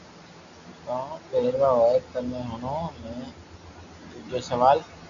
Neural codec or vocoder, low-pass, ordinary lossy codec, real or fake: none; 7.2 kHz; Opus, 64 kbps; real